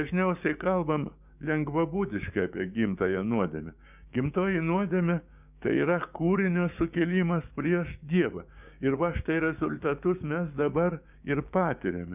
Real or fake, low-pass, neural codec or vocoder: fake; 3.6 kHz; codec, 16 kHz, 6 kbps, DAC